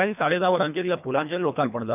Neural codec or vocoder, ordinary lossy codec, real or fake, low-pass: codec, 24 kHz, 1.5 kbps, HILCodec; none; fake; 3.6 kHz